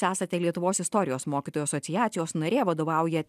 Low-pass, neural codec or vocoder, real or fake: 14.4 kHz; none; real